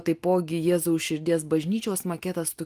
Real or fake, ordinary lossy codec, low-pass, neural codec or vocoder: real; Opus, 32 kbps; 14.4 kHz; none